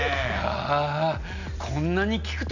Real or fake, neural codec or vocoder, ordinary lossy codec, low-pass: real; none; none; 7.2 kHz